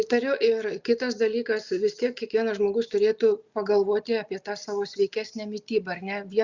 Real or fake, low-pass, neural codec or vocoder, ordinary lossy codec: real; 7.2 kHz; none; Opus, 64 kbps